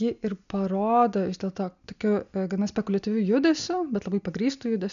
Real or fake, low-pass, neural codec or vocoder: real; 7.2 kHz; none